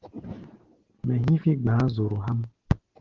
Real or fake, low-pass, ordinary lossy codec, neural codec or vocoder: real; 7.2 kHz; Opus, 16 kbps; none